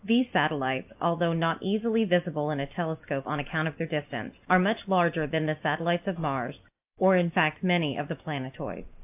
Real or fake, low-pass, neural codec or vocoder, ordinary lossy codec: real; 3.6 kHz; none; AAC, 32 kbps